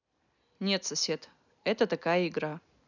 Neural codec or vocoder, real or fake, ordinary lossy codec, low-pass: none; real; none; 7.2 kHz